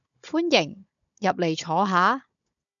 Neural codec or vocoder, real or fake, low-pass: codec, 16 kHz, 16 kbps, FunCodec, trained on Chinese and English, 50 frames a second; fake; 7.2 kHz